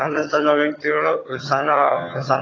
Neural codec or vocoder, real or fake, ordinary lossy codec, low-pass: vocoder, 22.05 kHz, 80 mel bands, HiFi-GAN; fake; AAC, 32 kbps; 7.2 kHz